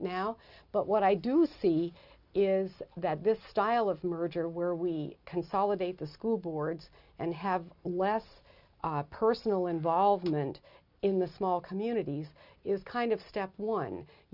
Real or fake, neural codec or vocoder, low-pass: real; none; 5.4 kHz